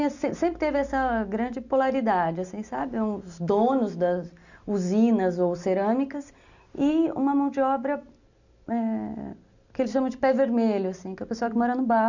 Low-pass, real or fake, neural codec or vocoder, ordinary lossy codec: 7.2 kHz; real; none; none